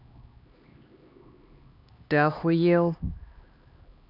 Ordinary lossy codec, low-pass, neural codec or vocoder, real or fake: none; 5.4 kHz; codec, 16 kHz, 2 kbps, X-Codec, HuBERT features, trained on LibriSpeech; fake